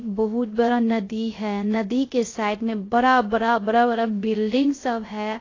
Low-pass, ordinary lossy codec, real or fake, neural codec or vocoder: 7.2 kHz; AAC, 32 kbps; fake; codec, 16 kHz, 0.3 kbps, FocalCodec